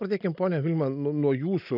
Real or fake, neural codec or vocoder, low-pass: real; none; 5.4 kHz